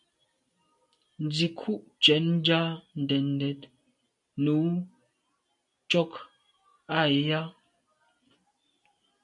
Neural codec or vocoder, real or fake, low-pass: none; real; 10.8 kHz